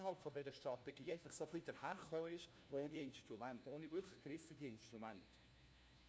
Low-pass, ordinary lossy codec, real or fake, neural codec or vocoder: none; none; fake; codec, 16 kHz, 1 kbps, FunCodec, trained on LibriTTS, 50 frames a second